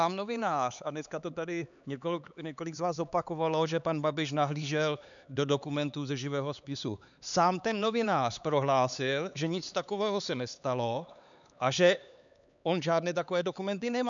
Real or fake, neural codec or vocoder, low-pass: fake; codec, 16 kHz, 4 kbps, X-Codec, HuBERT features, trained on LibriSpeech; 7.2 kHz